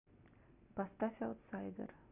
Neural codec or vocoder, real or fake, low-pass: none; real; 3.6 kHz